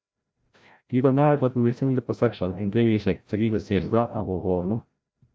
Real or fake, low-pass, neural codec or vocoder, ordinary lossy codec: fake; none; codec, 16 kHz, 0.5 kbps, FreqCodec, larger model; none